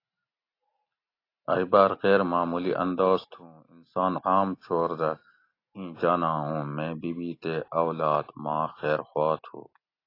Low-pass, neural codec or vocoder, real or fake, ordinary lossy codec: 5.4 kHz; none; real; AAC, 32 kbps